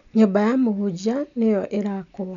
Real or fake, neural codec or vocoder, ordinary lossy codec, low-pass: real; none; MP3, 96 kbps; 7.2 kHz